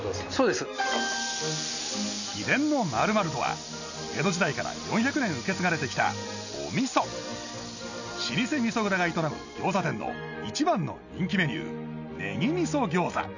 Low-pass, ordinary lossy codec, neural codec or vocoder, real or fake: 7.2 kHz; none; none; real